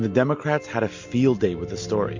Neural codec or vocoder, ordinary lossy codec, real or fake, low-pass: none; MP3, 48 kbps; real; 7.2 kHz